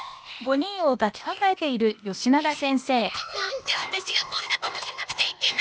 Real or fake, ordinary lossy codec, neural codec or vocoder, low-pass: fake; none; codec, 16 kHz, 0.8 kbps, ZipCodec; none